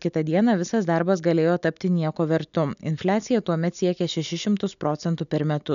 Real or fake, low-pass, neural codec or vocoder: real; 7.2 kHz; none